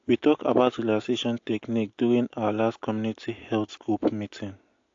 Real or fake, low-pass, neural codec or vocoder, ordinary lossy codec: real; 7.2 kHz; none; AAC, 48 kbps